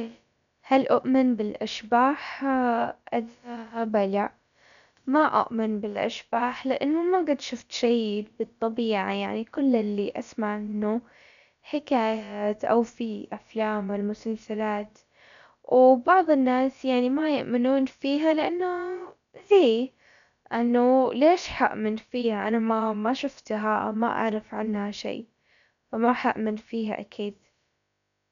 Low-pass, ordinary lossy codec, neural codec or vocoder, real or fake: 7.2 kHz; none; codec, 16 kHz, about 1 kbps, DyCAST, with the encoder's durations; fake